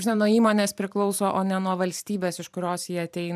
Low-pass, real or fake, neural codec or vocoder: 14.4 kHz; fake; vocoder, 44.1 kHz, 128 mel bands every 512 samples, BigVGAN v2